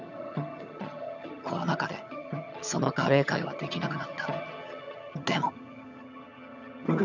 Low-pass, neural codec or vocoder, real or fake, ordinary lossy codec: 7.2 kHz; vocoder, 22.05 kHz, 80 mel bands, HiFi-GAN; fake; none